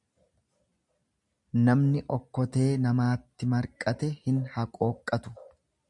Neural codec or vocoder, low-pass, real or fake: none; 10.8 kHz; real